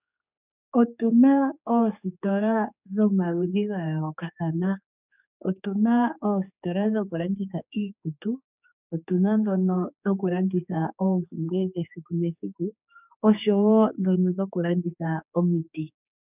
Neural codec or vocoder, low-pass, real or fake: codec, 16 kHz, 4 kbps, X-Codec, HuBERT features, trained on general audio; 3.6 kHz; fake